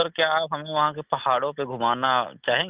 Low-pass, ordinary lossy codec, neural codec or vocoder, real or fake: 3.6 kHz; Opus, 32 kbps; none; real